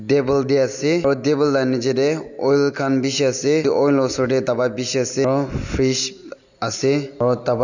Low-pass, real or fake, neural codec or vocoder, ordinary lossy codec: 7.2 kHz; real; none; none